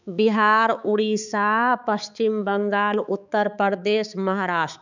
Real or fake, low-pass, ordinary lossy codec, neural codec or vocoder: fake; 7.2 kHz; none; codec, 16 kHz, 4 kbps, X-Codec, HuBERT features, trained on balanced general audio